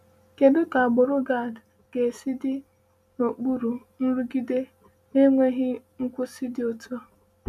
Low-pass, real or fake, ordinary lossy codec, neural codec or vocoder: 14.4 kHz; real; none; none